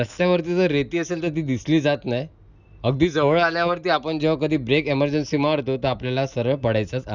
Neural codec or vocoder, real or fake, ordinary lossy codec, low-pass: none; real; none; 7.2 kHz